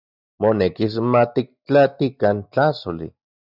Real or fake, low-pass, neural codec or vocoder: real; 5.4 kHz; none